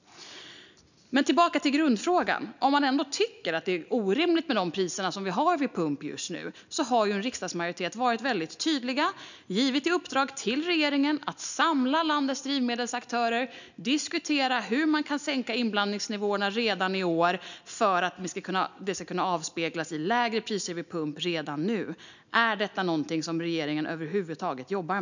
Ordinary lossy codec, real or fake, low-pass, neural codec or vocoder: none; real; 7.2 kHz; none